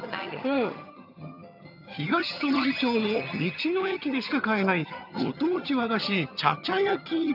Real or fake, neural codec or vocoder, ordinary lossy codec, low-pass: fake; vocoder, 22.05 kHz, 80 mel bands, HiFi-GAN; none; 5.4 kHz